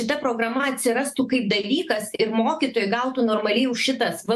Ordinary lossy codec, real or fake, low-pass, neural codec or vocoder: AAC, 96 kbps; fake; 14.4 kHz; autoencoder, 48 kHz, 128 numbers a frame, DAC-VAE, trained on Japanese speech